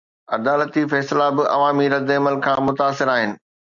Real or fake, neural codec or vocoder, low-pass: real; none; 7.2 kHz